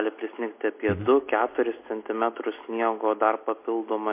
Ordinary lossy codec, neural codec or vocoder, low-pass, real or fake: MP3, 24 kbps; none; 3.6 kHz; real